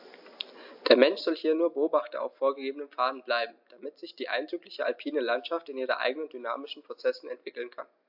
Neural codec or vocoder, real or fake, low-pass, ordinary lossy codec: none; real; 5.4 kHz; AAC, 48 kbps